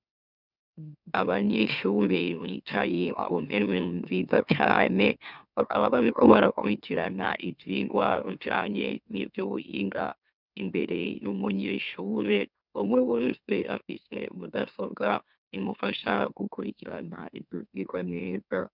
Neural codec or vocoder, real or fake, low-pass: autoencoder, 44.1 kHz, a latent of 192 numbers a frame, MeloTTS; fake; 5.4 kHz